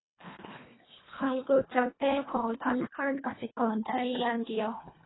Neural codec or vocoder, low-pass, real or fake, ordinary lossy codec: codec, 24 kHz, 1.5 kbps, HILCodec; 7.2 kHz; fake; AAC, 16 kbps